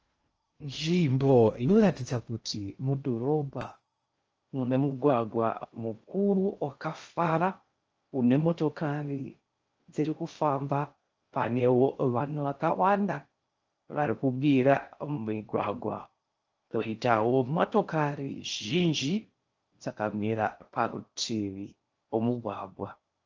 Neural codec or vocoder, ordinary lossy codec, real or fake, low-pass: codec, 16 kHz in and 24 kHz out, 0.6 kbps, FocalCodec, streaming, 2048 codes; Opus, 24 kbps; fake; 7.2 kHz